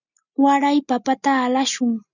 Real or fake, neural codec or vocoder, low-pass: real; none; 7.2 kHz